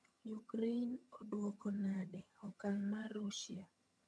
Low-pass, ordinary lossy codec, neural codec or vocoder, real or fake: none; none; vocoder, 22.05 kHz, 80 mel bands, HiFi-GAN; fake